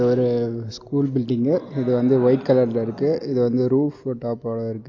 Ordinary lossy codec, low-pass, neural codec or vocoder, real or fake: AAC, 48 kbps; 7.2 kHz; none; real